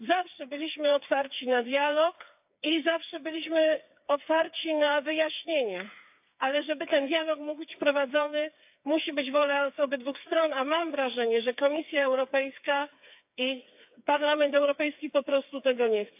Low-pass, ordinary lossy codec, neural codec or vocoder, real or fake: 3.6 kHz; AAC, 32 kbps; codec, 16 kHz, 4 kbps, FreqCodec, smaller model; fake